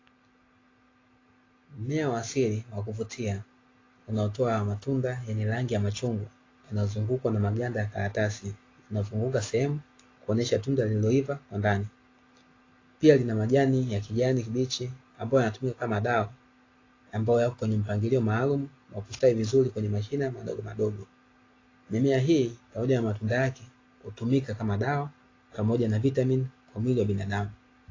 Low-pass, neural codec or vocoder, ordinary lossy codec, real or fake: 7.2 kHz; none; AAC, 32 kbps; real